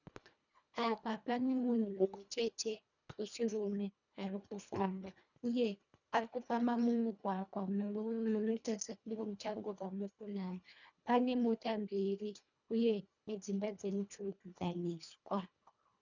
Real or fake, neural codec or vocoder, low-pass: fake; codec, 24 kHz, 1.5 kbps, HILCodec; 7.2 kHz